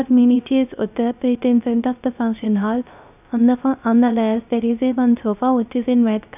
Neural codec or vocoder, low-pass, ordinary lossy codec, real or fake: codec, 16 kHz, 0.3 kbps, FocalCodec; 3.6 kHz; none; fake